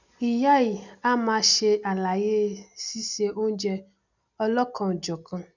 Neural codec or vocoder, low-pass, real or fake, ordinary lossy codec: none; 7.2 kHz; real; none